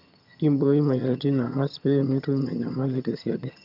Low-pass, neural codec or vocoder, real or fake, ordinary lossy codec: 5.4 kHz; vocoder, 22.05 kHz, 80 mel bands, HiFi-GAN; fake; none